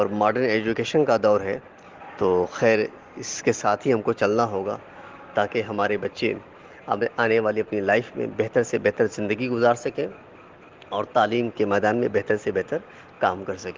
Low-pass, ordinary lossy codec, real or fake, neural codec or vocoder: 7.2 kHz; Opus, 16 kbps; real; none